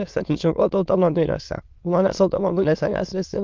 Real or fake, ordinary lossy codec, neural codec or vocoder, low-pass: fake; Opus, 24 kbps; autoencoder, 22.05 kHz, a latent of 192 numbers a frame, VITS, trained on many speakers; 7.2 kHz